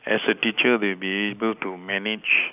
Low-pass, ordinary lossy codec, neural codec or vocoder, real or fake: 3.6 kHz; none; none; real